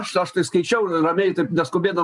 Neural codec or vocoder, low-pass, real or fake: none; 10.8 kHz; real